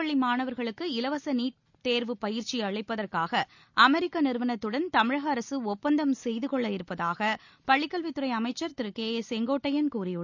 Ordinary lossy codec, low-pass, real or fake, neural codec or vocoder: none; 7.2 kHz; real; none